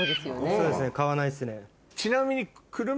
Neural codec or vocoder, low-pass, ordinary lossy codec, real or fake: none; none; none; real